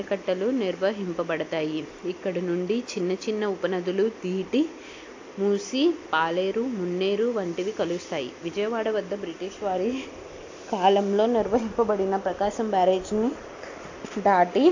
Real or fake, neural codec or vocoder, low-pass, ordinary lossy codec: real; none; 7.2 kHz; AAC, 48 kbps